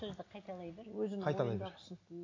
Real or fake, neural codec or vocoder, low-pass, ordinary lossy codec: real; none; 7.2 kHz; AAC, 32 kbps